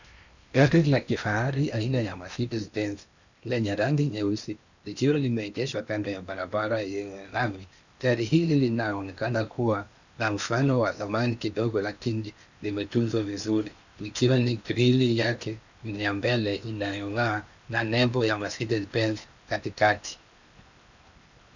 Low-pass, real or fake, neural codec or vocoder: 7.2 kHz; fake; codec, 16 kHz in and 24 kHz out, 0.8 kbps, FocalCodec, streaming, 65536 codes